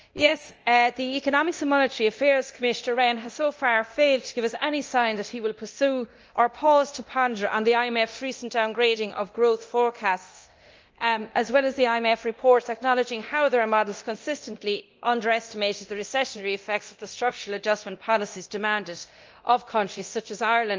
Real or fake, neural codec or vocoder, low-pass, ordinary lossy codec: fake; codec, 24 kHz, 0.9 kbps, DualCodec; 7.2 kHz; Opus, 24 kbps